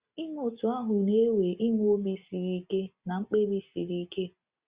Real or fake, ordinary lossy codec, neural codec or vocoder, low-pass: real; Opus, 64 kbps; none; 3.6 kHz